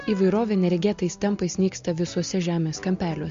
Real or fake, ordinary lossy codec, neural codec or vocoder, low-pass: real; MP3, 48 kbps; none; 7.2 kHz